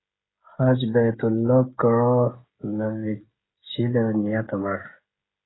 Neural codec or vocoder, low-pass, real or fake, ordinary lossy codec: codec, 16 kHz, 16 kbps, FreqCodec, smaller model; 7.2 kHz; fake; AAC, 16 kbps